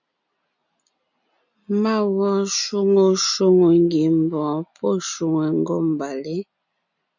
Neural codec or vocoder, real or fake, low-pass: none; real; 7.2 kHz